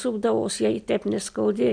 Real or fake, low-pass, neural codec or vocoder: real; 9.9 kHz; none